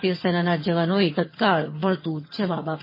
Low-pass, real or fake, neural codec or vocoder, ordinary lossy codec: 5.4 kHz; fake; vocoder, 22.05 kHz, 80 mel bands, HiFi-GAN; MP3, 24 kbps